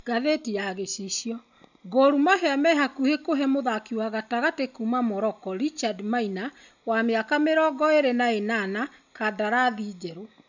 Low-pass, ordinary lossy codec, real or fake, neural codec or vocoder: 7.2 kHz; none; real; none